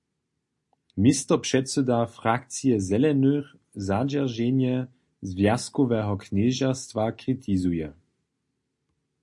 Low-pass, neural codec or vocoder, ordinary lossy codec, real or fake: 10.8 kHz; none; MP3, 48 kbps; real